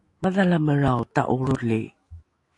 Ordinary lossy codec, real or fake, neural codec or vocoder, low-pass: AAC, 48 kbps; fake; autoencoder, 48 kHz, 128 numbers a frame, DAC-VAE, trained on Japanese speech; 10.8 kHz